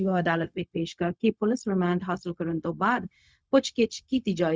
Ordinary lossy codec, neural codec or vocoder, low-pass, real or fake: none; codec, 16 kHz, 0.4 kbps, LongCat-Audio-Codec; none; fake